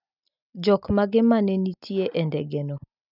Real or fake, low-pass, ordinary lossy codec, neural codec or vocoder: real; 5.4 kHz; none; none